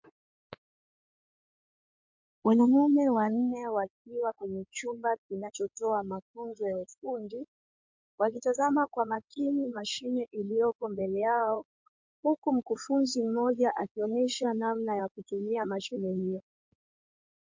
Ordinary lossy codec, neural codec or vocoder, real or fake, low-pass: MP3, 48 kbps; codec, 16 kHz in and 24 kHz out, 2.2 kbps, FireRedTTS-2 codec; fake; 7.2 kHz